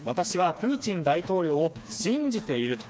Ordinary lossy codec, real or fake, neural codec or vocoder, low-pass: none; fake; codec, 16 kHz, 2 kbps, FreqCodec, smaller model; none